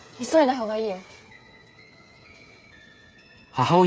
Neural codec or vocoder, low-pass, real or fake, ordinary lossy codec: codec, 16 kHz, 8 kbps, FreqCodec, smaller model; none; fake; none